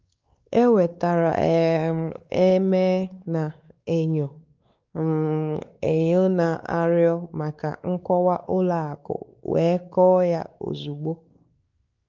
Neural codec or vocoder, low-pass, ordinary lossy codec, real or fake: codec, 16 kHz, 4 kbps, X-Codec, WavLM features, trained on Multilingual LibriSpeech; 7.2 kHz; Opus, 32 kbps; fake